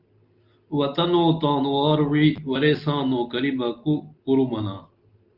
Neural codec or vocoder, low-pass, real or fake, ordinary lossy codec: none; 5.4 kHz; real; Opus, 32 kbps